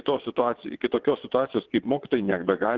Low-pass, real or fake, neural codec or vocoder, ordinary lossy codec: 7.2 kHz; fake; vocoder, 22.05 kHz, 80 mel bands, WaveNeXt; Opus, 16 kbps